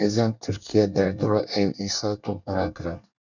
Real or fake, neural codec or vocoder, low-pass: fake; codec, 24 kHz, 1 kbps, SNAC; 7.2 kHz